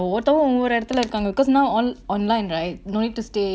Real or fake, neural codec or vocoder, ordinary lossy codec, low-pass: real; none; none; none